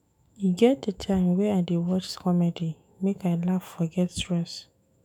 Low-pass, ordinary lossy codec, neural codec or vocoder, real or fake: 19.8 kHz; none; autoencoder, 48 kHz, 128 numbers a frame, DAC-VAE, trained on Japanese speech; fake